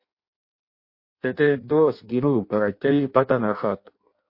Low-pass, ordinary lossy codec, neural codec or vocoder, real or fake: 5.4 kHz; MP3, 32 kbps; codec, 16 kHz in and 24 kHz out, 0.6 kbps, FireRedTTS-2 codec; fake